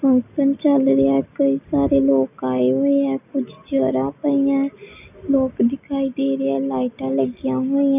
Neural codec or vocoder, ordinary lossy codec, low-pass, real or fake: none; none; 3.6 kHz; real